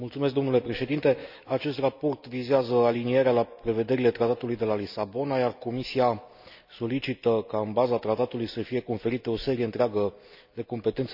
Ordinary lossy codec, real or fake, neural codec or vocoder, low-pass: none; real; none; 5.4 kHz